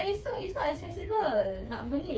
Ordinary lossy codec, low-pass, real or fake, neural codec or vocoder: none; none; fake; codec, 16 kHz, 4 kbps, FreqCodec, smaller model